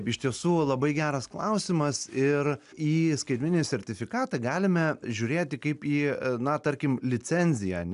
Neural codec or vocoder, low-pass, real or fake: none; 10.8 kHz; real